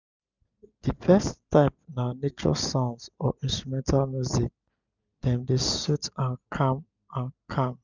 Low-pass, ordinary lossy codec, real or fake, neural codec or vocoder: 7.2 kHz; none; real; none